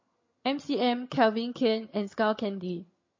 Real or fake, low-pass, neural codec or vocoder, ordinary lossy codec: fake; 7.2 kHz; vocoder, 22.05 kHz, 80 mel bands, HiFi-GAN; MP3, 32 kbps